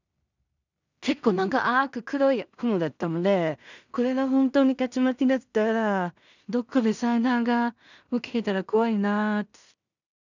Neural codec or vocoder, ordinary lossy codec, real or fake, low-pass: codec, 16 kHz in and 24 kHz out, 0.4 kbps, LongCat-Audio-Codec, two codebook decoder; none; fake; 7.2 kHz